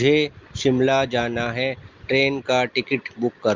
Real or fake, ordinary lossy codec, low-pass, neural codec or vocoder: real; Opus, 32 kbps; 7.2 kHz; none